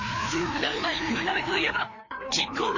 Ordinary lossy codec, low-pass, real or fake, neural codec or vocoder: MP3, 32 kbps; 7.2 kHz; fake; codec, 16 kHz, 2 kbps, FreqCodec, larger model